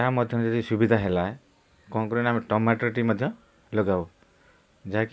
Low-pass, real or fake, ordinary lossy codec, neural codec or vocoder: none; real; none; none